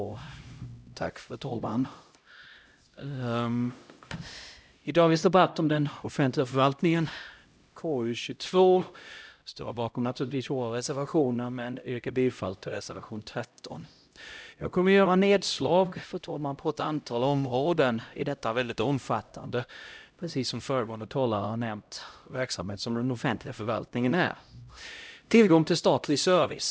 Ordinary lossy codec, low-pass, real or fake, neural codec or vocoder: none; none; fake; codec, 16 kHz, 0.5 kbps, X-Codec, HuBERT features, trained on LibriSpeech